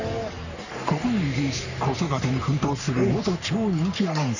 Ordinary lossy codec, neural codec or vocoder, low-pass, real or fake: none; codec, 44.1 kHz, 3.4 kbps, Pupu-Codec; 7.2 kHz; fake